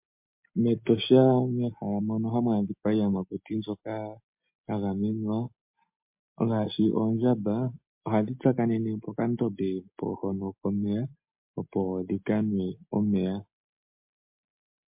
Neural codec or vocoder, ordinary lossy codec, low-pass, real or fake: none; MP3, 32 kbps; 3.6 kHz; real